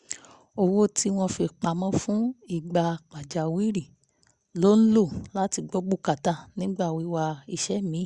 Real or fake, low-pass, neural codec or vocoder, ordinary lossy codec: real; 10.8 kHz; none; Opus, 64 kbps